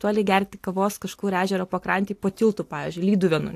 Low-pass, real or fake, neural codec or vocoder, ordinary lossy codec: 14.4 kHz; real; none; AAC, 64 kbps